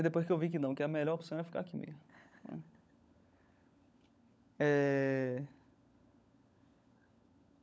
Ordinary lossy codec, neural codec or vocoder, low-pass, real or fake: none; codec, 16 kHz, 16 kbps, FunCodec, trained on LibriTTS, 50 frames a second; none; fake